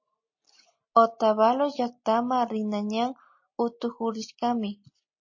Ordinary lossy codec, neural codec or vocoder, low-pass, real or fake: MP3, 32 kbps; none; 7.2 kHz; real